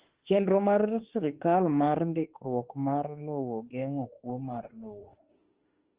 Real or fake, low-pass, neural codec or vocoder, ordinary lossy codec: fake; 3.6 kHz; autoencoder, 48 kHz, 32 numbers a frame, DAC-VAE, trained on Japanese speech; Opus, 16 kbps